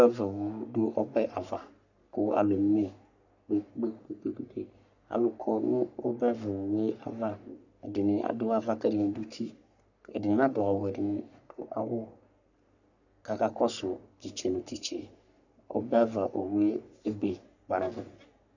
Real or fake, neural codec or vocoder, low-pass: fake; codec, 44.1 kHz, 3.4 kbps, Pupu-Codec; 7.2 kHz